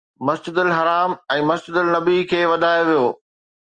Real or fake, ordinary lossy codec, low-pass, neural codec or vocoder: real; Opus, 24 kbps; 9.9 kHz; none